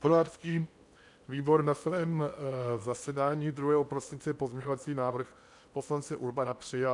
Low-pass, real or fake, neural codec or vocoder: 10.8 kHz; fake; codec, 16 kHz in and 24 kHz out, 0.8 kbps, FocalCodec, streaming, 65536 codes